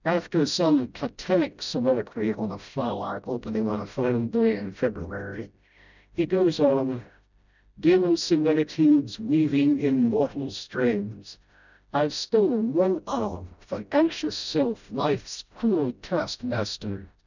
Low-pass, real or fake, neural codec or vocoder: 7.2 kHz; fake; codec, 16 kHz, 0.5 kbps, FreqCodec, smaller model